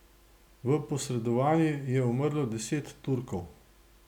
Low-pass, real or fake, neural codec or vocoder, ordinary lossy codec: 19.8 kHz; real; none; none